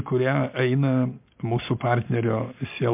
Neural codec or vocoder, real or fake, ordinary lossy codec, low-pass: none; real; MP3, 32 kbps; 3.6 kHz